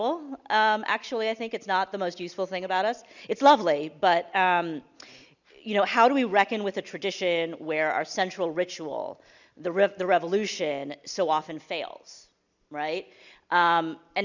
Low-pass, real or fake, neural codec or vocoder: 7.2 kHz; real; none